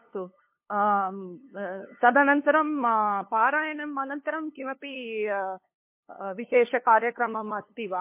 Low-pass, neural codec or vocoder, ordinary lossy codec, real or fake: 3.6 kHz; codec, 16 kHz, 2 kbps, FunCodec, trained on LibriTTS, 25 frames a second; MP3, 24 kbps; fake